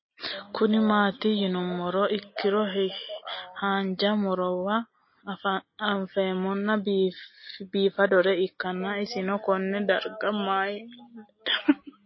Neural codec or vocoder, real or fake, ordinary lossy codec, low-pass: none; real; MP3, 24 kbps; 7.2 kHz